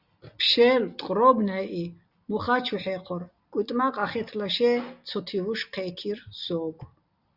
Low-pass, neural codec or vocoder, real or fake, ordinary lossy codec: 5.4 kHz; none; real; Opus, 64 kbps